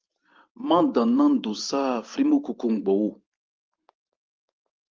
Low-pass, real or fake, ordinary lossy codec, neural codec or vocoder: 7.2 kHz; fake; Opus, 32 kbps; vocoder, 44.1 kHz, 128 mel bands every 512 samples, BigVGAN v2